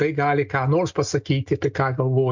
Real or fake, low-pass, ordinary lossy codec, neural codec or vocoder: real; 7.2 kHz; MP3, 64 kbps; none